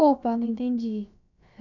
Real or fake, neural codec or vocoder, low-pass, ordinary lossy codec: fake; codec, 16 kHz, about 1 kbps, DyCAST, with the encoder's durations; 7.2 kHz; none